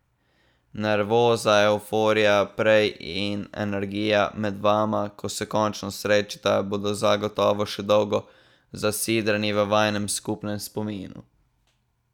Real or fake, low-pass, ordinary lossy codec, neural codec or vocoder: real; 19.8 kHz; none; none